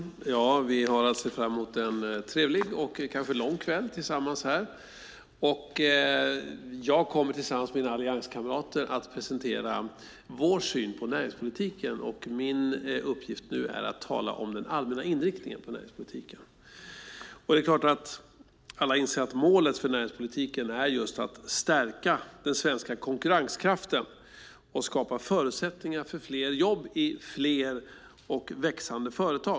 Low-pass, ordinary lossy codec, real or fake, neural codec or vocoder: none; none; real; none